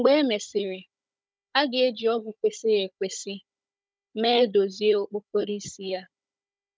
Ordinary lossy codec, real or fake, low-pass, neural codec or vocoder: none; fake; none; codec, 16 kHz, 16 kbps, FunCodec, trained on Chinese and English, 50 frames a second